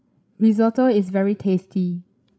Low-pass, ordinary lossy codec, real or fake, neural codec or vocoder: none; none; fake; codec, 16 kHz, 16 kbps, FreqCodec, larger model